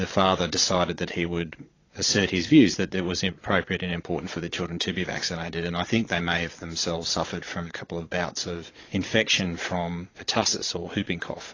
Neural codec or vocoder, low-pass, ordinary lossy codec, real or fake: vocoder, 22.05 kHz, 80 mel bands, Vocos; 7.2 kHz; AAC, 32 kbps; fake